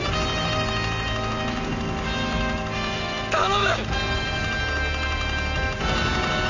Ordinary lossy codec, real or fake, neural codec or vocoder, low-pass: Opus, 64 kbps; real; none; 7.2 kHz